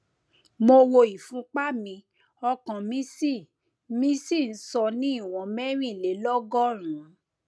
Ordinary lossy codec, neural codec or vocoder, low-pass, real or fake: none; none; none; real